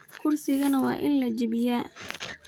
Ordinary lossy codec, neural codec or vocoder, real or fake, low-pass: none; codec, 44.1 kHz, 7.8 kbps, DAC; fake; none